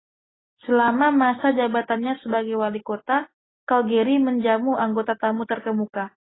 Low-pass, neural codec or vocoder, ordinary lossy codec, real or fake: 7.2 kHz; none; AAC, 16 kbps; real